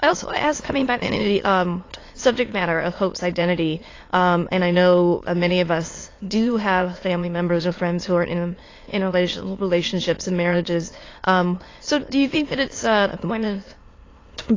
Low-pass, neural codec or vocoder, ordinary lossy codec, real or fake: 7.2 kHz; autoencoder, 22.05 kHz, a latent of 192 numbers a frame, VITS, trained on many speakers; AAC, 32 kbps; fake